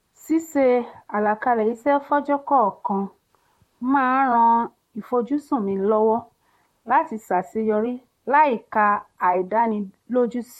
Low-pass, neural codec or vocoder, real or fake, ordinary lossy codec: 19.8 kHz; vocoder, 44.1 kHz, 128 mel bands, Pupu-Vocoder; fake; MP3, 64 kbps